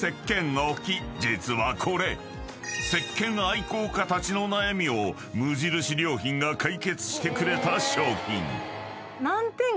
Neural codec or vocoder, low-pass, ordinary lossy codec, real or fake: none; none; none; real